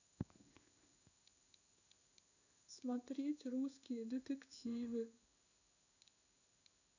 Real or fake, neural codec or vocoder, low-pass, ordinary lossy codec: fake; codec, 16 kHz in and 24 kHz out, 1 kbps, XY-Tokenizer; 7.2 kHz; none